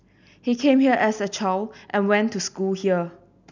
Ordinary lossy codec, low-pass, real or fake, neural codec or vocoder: none; 7.2 kHz; real; none